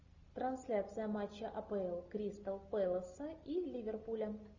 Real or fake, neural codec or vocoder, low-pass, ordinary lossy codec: real; none; 7.2 kHz; Opus, 64 kbps